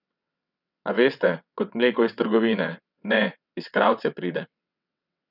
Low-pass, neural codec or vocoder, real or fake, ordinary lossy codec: 5.4 kHz; vocoder, 22.05 kHz, 80 mel bands, WaveNeXt; fake; none